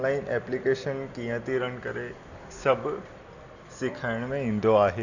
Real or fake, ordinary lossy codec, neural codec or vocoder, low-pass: real; none; none; 7.2 kHz